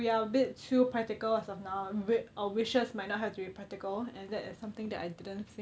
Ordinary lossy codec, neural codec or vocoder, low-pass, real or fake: none; none; none; real